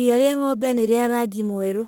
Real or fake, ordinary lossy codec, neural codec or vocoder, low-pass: fake; none; codec, 44.1 kHz, 1.7 kbps, Pupu-Codec; none